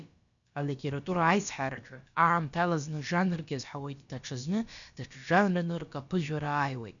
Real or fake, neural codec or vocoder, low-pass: fake; codec, 16 kHz, about 1 kbps, DyCAST, with the encoder's durations; 7.2 kHz